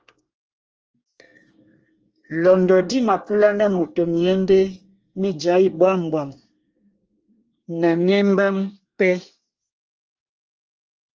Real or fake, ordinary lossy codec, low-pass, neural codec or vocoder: fake; Opus, 24 kbps; 7.2 kHz; codec, 24 kHz, 1 kbps, SNAC